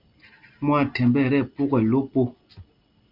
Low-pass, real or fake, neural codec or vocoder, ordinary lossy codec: 5.4 kHz; real; none; Opus, 32 kbps